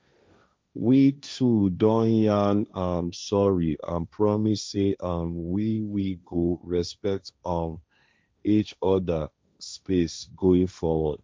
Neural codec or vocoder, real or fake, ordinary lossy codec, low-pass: codec, 16 kHz, 1.1 kbps, Voila-Tokenizer; fake; none; none